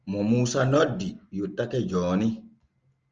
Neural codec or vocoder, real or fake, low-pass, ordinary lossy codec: none; real; 7.2 kHz; Opus, 24 kbps